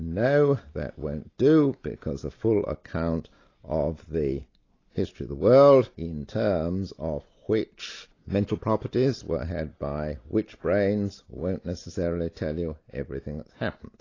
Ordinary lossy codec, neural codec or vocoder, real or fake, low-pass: AAC, 32 kbps; none; real; 7.2 kHz